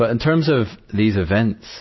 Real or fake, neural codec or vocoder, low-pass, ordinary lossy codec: real; none; 7.2 kHz; MP3, 24 kbps